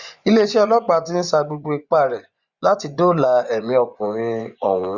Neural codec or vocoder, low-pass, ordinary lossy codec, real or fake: vocoder, 24 kHz, 100 mel bands, Vocos; 7.2 kHz; Opus, 64 kbps; fake